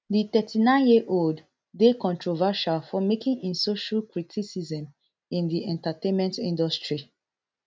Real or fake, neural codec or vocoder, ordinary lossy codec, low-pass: real; none; none; none